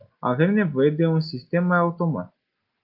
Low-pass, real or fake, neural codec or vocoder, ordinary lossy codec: 5.4 kHz; real; none; Opus, 32 kbps